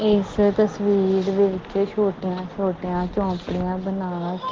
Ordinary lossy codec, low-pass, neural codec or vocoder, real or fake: Opus, 16 kbps; 7.2 kHz; none; real